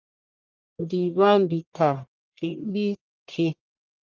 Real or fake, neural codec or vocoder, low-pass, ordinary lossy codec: fake; codec, 44.1 kHz, 1.7 kbps, Pupu-Codec; 7.2 kHz; Opus, 24 kbps